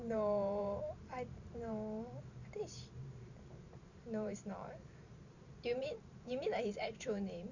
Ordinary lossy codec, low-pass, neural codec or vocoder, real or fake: none; 7.2 kHz; vocoder, 44.1 kHz, 128 mel bands every 512 samples, BigVGAN v2; fake